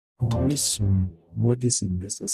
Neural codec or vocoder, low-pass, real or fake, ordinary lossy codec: codec, 44.1 kHz, 0.9 kbps, DAC; 14.4 kHz; fake; MP3, 96 kbps